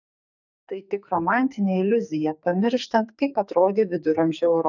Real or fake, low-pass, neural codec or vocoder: fake; 7.2 kHz; codec, 44.1 kHz, 2.6 kbps, SNAC